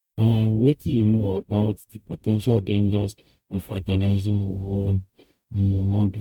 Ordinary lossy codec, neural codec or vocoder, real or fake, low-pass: MP3, 96 kbps; codec, 44.1 kHz, 0.9 kbps, DAC; fake; 19.8 kHz